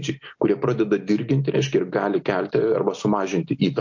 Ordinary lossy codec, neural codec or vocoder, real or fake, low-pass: MP3, 48 kbps; none; real; 7.2 kHz